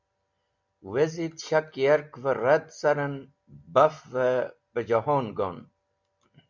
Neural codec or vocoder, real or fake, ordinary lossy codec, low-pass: none; real; MP3, 64 kbps; 7.2 kHz